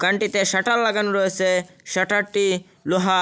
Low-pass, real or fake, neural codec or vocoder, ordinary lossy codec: none; real; none; none